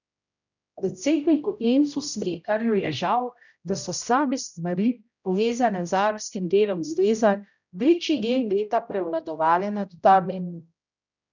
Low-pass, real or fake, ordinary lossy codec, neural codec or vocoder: 7.2 kHz; fake; none; codec, 16 kHz, 0.5 kbps, X-Codec, HuBERT features, trained on general audio